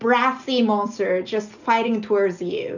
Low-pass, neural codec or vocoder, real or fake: 7.2 kHz; none; real